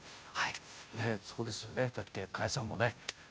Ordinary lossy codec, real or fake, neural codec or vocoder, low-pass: none; fake; codec, 16 kHz, 0.5 kbps, FunCodec, trained on Chinese and English, 25 frames a second; none